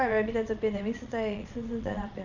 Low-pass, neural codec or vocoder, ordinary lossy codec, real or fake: 7.2 kHz; vocoder, 22.05 kHz, 80 mel bands, Vocos; none; fake